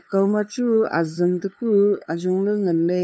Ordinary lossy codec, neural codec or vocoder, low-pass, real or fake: none; codec, 16 kHz, 2 kbps, FunCodec, trained on LibriTTS, 25 frames a second; none; fake